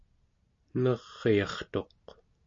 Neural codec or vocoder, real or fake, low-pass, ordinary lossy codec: none; real; 7.2 kHz; MP3, 48 kbps